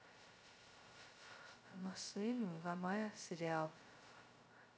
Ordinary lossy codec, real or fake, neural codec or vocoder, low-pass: none; fake; codec, 16 kHz, 0.2 kbps, FocalCodec; none